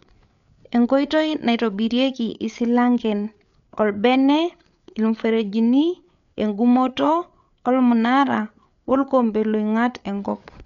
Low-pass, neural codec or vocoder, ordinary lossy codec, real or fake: 7.2 kHz; codec, 16 kHz, 8 kbps, FreqCodec, larger model; none; fake